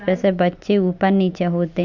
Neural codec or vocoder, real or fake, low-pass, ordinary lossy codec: none; real; 7.2 kHz; none